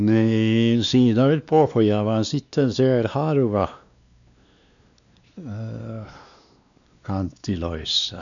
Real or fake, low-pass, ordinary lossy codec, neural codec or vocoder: fake; 7.2 kHz; none; codec, 16 kHz, 2 kbps, X-Codec, WavLM features, trained on Multilingual LibriSpeech